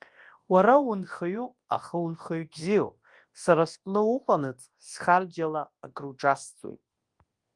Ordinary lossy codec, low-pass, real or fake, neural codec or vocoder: Opus, 24 kbps; 10.8 kHz; fake; codec, 24 kHz, 0.9 kbps, WavTokenizer, large speech release